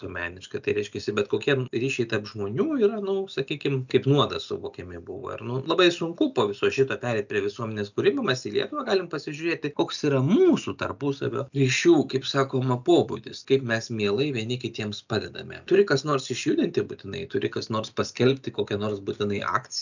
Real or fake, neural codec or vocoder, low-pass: real; none; 7.2 kHz